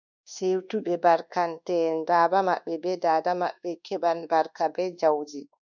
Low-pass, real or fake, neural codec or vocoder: 7.2 kHz; fake; codec, 24 kHz, 1.2 kbps, DualCodec